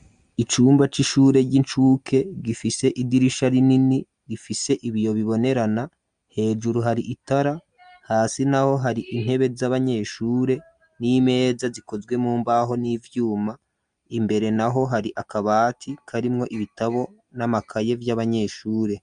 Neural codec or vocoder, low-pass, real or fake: none; 9.9 kHz; real